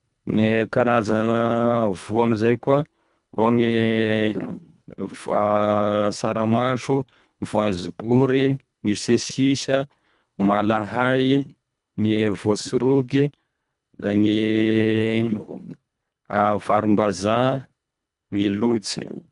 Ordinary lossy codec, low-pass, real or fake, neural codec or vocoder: none; 10.8 kHz; fake; codec, 24 kHz, 1.5 kbps, HILCodec